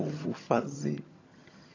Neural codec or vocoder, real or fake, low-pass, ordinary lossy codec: vocoder, 22.05 kHz, 80 mel bands, HiFi-GAN; fake; 7.2 kHz; AAC, 32 kbps